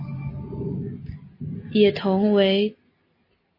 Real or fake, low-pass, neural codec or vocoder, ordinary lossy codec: real; 5.4 kHz; none; MP3, 24 kbps